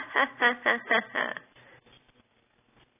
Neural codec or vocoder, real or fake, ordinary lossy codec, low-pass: none; real; AAC, 16 kbps; 3.6 kHz